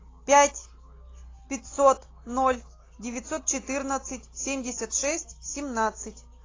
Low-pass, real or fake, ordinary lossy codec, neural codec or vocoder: 7.2 kHz; real; AAC, 32 kbps; none